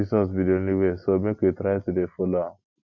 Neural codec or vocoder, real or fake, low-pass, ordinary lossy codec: none; real; 7.2 kHz; none